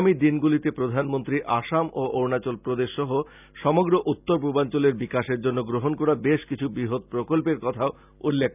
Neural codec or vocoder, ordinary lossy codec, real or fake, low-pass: none; none; real; 3.6 kHz